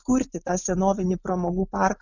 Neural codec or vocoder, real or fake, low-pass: none; real; 7.2 kHz